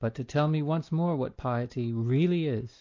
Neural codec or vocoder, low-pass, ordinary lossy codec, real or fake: none; 7.2 kHz; MP3, 48 kbps; real